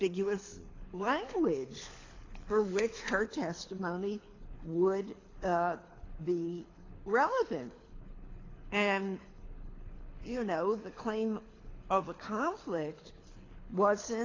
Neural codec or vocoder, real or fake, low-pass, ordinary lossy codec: codec, 24 kHz, 6 kbps, HILCodec; fake; 7.2 kHz; AAC, 32 kbps